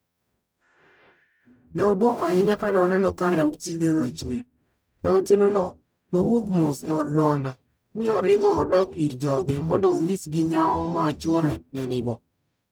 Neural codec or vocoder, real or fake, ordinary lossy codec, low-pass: codec, 44.1 kHz, 0.9 kbps, DAC; fake; none; none